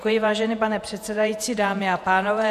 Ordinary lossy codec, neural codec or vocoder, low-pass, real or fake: MP3, 96 kbps; vocoder, 48 kHz, 128 mel bands, Vocos; 14.4 kHz; fake